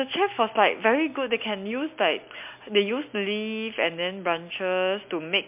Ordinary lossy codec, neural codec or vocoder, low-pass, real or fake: MP3, 32 kbps; none; 3.6 kHz; real